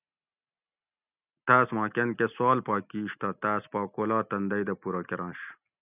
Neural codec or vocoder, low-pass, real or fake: none; 3.6 kHz; real